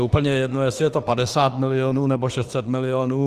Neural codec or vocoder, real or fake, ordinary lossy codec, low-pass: autoencoder, 48 kHz, 32 numbers a frame, DAC-VAE, trained on Japanese speech; fake; Opus, 24 kbps; 14.4 kHz